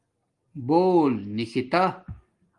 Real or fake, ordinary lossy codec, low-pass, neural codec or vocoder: real; Opus, 24 kbps; 10.8 kHz; none